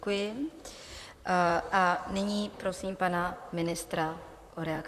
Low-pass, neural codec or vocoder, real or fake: 14.4 kHz; vocoder, 44.1 kHz, 128 mel bands, Pupu-Vocoder; fake